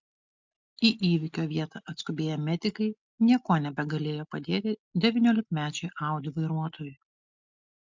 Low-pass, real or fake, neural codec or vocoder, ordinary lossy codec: 7.2 kHz; real; none; MP3, 64 kbps